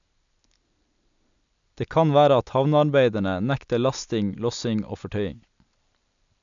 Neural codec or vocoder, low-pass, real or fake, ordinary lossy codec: none; 7.2 kHz; real; none